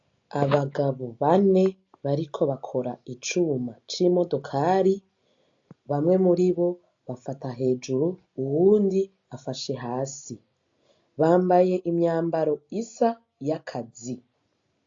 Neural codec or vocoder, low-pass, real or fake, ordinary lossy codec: none; 7.2 kHz; real; AAC, 48 kbps